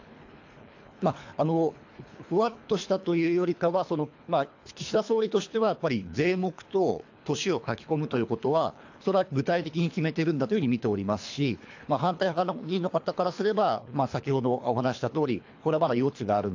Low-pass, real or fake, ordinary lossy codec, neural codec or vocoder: 7.2 kHz; fake; AAC, 48 kbps; codec, 24 kHz, 3 kbps, HILCodec